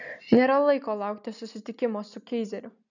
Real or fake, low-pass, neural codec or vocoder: real; 7.2 kHz; none